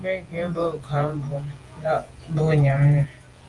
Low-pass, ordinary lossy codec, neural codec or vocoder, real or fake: 10.8 kHz; Opus, 24 kbps; vocoder, 48 kHz, 128 mel bands, Vocos; fake